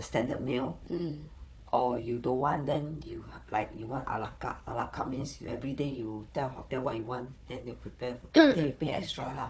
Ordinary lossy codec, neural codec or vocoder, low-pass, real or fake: none; codec, 16 kHz, 4 kbps, FunCodec, trained on Chinese and English, 50 frames a second; none; fake